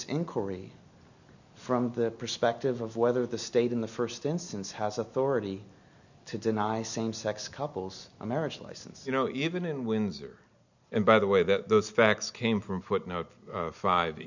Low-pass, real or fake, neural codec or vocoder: 7.2 kHz; real; none